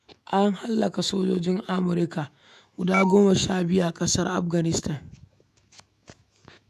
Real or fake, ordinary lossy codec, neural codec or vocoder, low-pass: fake; none; autoencoder, 48 kHz, 128 numbers a frame, DAC-VAE, trained on Japanese speech; 14.4 kHz